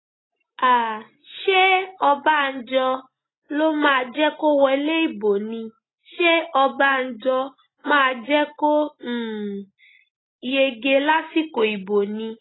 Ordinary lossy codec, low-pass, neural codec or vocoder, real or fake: AAC, 16 kbps; 7.2 kHz; none; real